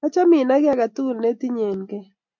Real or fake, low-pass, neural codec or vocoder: real; 7.2 kHz; none